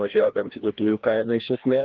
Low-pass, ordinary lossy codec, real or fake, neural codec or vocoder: 7.2 kHz; Opus, 16 kbps; fake; codec, 16 kHz, 1 kbps, FreqCodec, larger model